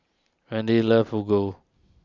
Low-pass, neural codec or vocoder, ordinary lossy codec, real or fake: 7.2 kHz; none; Opus, 64 kbps; real